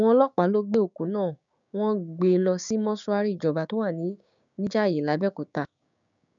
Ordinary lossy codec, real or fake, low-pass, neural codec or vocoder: MP3, 64 kbps; fake; 7.2 kHz; codec, 16 kHz, 6 kbps, DAC